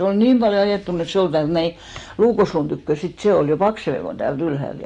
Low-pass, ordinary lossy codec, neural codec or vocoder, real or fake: 10.8 kHz; AAC, 48 kbps; none; real